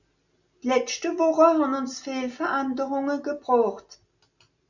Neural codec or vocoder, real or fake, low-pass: none; real; 7.2 kHz